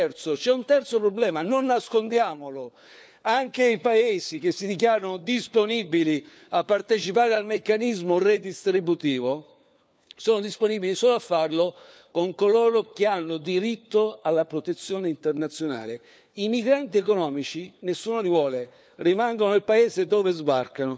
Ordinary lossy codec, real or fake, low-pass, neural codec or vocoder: none; fake; none; codec, 16 kHz, 4 kbps, FunCodec, trained on LibriTTS, 50 frames a second